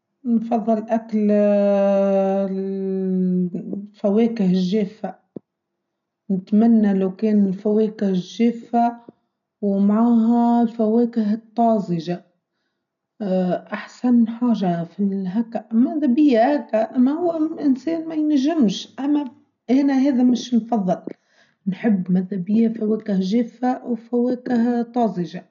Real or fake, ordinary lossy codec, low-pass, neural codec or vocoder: real; none; 7.2 kHz; none